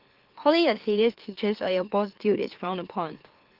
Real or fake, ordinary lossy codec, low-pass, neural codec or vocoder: fake; Opus, 16 kbps; 5.4 kHz; autoencoder, 44.1 kHz, a latent of 192 numbers a frame, MeloTTS